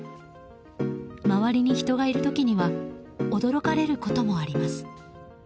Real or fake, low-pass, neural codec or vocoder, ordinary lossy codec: real; none; none; none